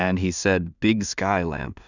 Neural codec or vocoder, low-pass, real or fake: codec, 16 kHz in and 24 kHz out, 0.4 kbps, LongCat-Audio-Codec, two codebook decoder; 7.2 kHz; fake